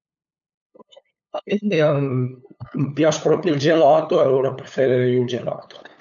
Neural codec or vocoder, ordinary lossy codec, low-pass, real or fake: codec, 16 kHz, 8 kbps, FunCodec, trained on LibriTTS, 25 frames a second; none; 7.2 kHz; fake